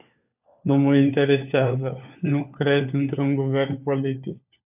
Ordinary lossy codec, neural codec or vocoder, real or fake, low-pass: AAC, 32 kbps; codec, 16 kHz, 4 kbps, FunCodec, trained on LibriTTS, 50 frames a second; fake; 3.6 kHz